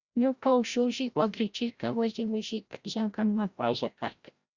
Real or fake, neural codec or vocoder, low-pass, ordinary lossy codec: fake; codec, 16 kHz, 0.5 kbps, FreqCodec, larger model; 7.2 kHz; Opus, 64 kbps